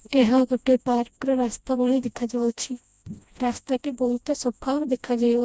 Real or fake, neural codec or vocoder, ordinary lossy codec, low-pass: fake; codec, 16 kHz, 1 kbps, FreqCodec, smaller model; none; none